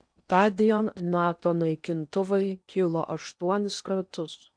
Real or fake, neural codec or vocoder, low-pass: fake; codec, 16 kHz in and 24 kHz out, 0.8 kbps, FocalCodec, streaming, 65536 codes; 9.9 kHz